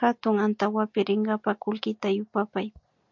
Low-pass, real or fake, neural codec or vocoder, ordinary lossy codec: 7.2 kHz; real; none; MP3, 48 kbps